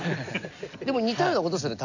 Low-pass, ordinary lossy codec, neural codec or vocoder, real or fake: 7.2 kHz; none; none; real